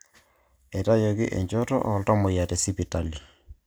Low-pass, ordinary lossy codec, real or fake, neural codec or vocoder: none; none; real; none